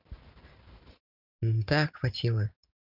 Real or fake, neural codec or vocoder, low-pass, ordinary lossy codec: fake; vocoder, 44.1 kHz, 128 mel bands every 512 samples, BigVGAN v2; 5.4 kHz; none